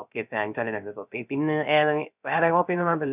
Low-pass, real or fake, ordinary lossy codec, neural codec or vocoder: 3.6 kHz; fake; none; codec, 16 kHz, 0.3 kbps, FocalCodec